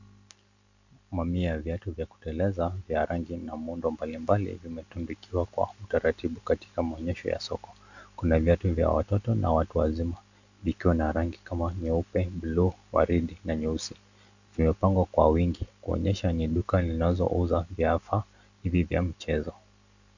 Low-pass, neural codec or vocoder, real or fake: 7.2 kHz; none; real